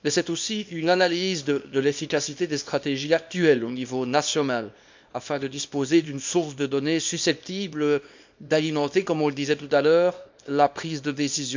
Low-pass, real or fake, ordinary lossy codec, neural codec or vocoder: 7.2 kHz; fake; MP3, 64 kbps; codec, 24 kHz, 0.9 kbps, WavTokenizer, small release